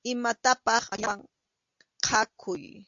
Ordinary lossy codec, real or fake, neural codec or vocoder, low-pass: AAC, 64 kbps; real; none; 7.2 kHz